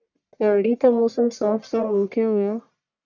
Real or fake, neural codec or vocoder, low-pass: fake; codec, 44.1 kHz, 1.7 kbps, Pupu-Codec; 7.2 kHz